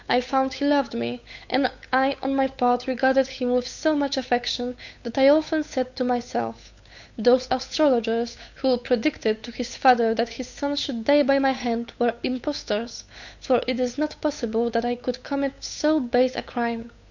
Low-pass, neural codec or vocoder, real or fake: 7.2 kHz; codec, 16 kHz, 8 kbps, FunCodec, trained on Chinese and English, 25 frames a second; fake